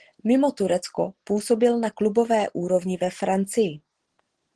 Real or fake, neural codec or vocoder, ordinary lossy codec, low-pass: real; none; Opus, 16 kbps; 9.9 kHz